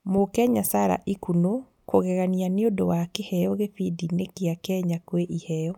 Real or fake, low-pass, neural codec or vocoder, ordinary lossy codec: real; 19.8 kHz; none; none